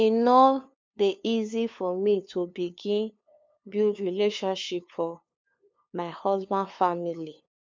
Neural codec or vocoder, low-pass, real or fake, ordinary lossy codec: codec, 16 kHz, 2 kbps, FunCodec, trained on LibriTTS, 25 frames a second; none; fake; none